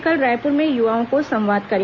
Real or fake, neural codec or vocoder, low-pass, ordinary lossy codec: real; none; 7.2 kHz; MP3, 64 kbps